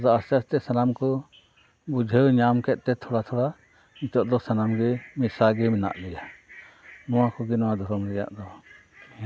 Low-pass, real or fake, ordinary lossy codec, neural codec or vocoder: none; real; none; none